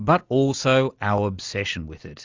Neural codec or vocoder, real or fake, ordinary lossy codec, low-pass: none; real; Opus, 32 kbps; 7.2 kHz